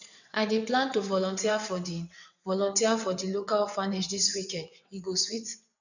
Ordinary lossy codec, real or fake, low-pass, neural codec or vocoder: none; fake; 7.2 kHz; vocoder, 22.05 kHz, 80 mel bands, WaveNeXt